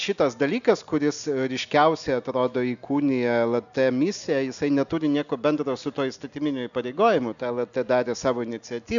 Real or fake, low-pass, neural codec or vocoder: real; 7.2 kHz; none